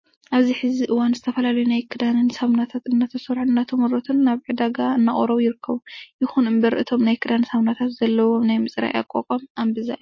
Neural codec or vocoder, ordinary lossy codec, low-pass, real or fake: none; MP3, 32 kbps; 7.2 kHz; real